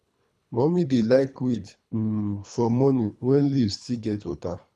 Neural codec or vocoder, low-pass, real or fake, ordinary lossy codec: codec, 24 kHz, 3 kbps, HILCodec; none; fake; none